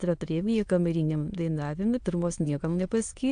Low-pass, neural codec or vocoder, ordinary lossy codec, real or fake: 9.9 kHz; autoencoder, 22.05 kHz, a latent of 192 numbers a frame, VITS, trained on many speakers; AAC, 64 kbps; fake